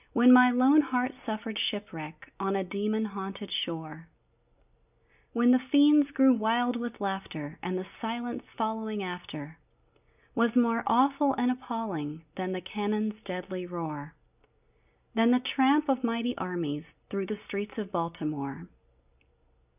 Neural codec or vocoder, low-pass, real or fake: none; 3.6 kHz; real